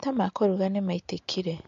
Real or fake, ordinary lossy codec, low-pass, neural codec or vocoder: real; MP3, 48 kbps; 7.2 kHz; none